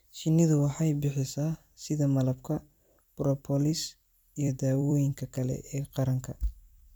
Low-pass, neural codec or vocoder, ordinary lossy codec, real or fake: none; vocoder, 44.1 kHz, 128 mel bands every 256 samples, BigVGAN v2; none; fake